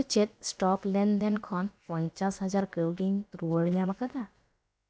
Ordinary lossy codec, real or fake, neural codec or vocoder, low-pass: none; fake; codec, 16 kHz, about 1 kbps, DyCAST, with the encoder's durations; none